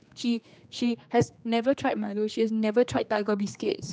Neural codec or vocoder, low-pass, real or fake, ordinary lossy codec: codec, 16 kHz, 2 kbps, X-Codec, HuBERT features, trained on general audio; none; fake; none